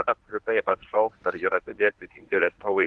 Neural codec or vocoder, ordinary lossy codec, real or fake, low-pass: codec, 24 kHz, 0.9 kbps, WavTokenizer, medium speech release version 1; Opus, 16 kbps; fake; 10.8 kHz